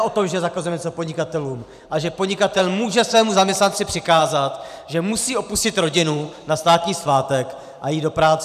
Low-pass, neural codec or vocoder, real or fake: 14.4 kHz; vocoder, 44.1 kHz, 128 mel bands every 512 samples, BigVGAN v2; fake